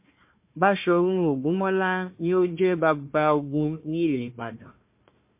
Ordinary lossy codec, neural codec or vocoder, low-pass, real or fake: MP3, 24 kbps; codec, 16 kHz, 1 kbps, FunCodec, trained on Chinese and English, 50 frames a second; 3.6 kHz; fake